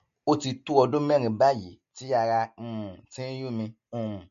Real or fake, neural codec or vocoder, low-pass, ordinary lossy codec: real; none; 7.2 kHz; MP3, 48 kbps